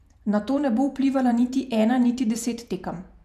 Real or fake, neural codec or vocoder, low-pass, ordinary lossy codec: fake; vocoder, 48 kHz, 128 mel bands, Vocos; 14.4 kHz; none